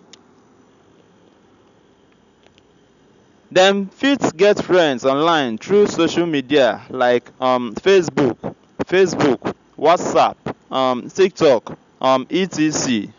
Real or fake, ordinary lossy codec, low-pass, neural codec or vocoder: real; none; 7.2 kHz; none